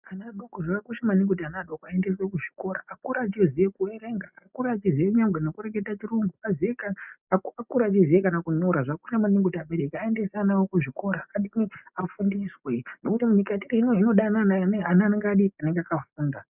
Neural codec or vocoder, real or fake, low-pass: none; real; 3.6 kHz